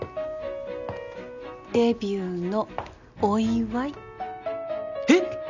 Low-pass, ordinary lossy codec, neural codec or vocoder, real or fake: 7.2 kHz; none; none; real